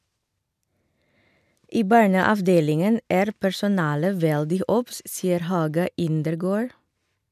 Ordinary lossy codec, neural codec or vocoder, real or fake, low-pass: none; none; real; 14.4 kHz